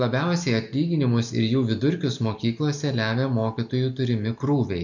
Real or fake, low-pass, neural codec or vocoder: real; 7.2 kHz; none